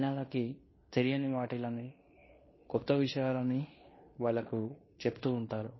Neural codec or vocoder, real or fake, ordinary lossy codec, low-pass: codec, 16 kHz in and 24 kHz out, 0.9 kbps, LongCat-Audio-Codec, fine tuned four codebook decoder; fake; MP3, 24 kbps; 7.2 kHz